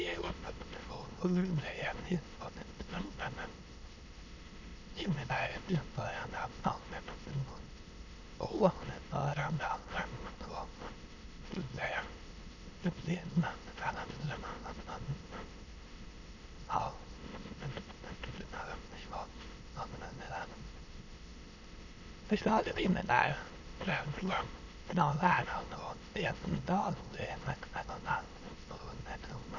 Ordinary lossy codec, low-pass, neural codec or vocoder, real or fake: none; 7.2 kHz; autoencoder, 22.05 kHz, a latent of 192 numbers a frame, VITS, trained on many speakers; fake